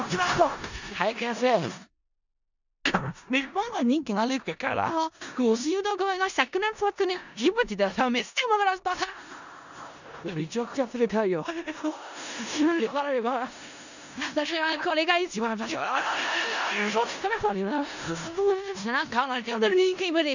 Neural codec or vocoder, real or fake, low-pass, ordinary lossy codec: codec, 16 kHz in and 24 kHz out, 0.4 kbps, LongCat-Audio-Codec, four codebook decoder; fake; 7.2 kHz; none